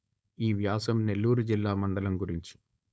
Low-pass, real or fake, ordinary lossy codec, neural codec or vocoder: none; fake; none; codec, 16 kHz, 4.8 kbps, FACodec